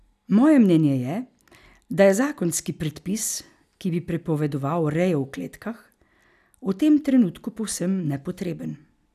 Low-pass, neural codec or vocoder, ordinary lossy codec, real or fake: 14.4 kHz; none; none; real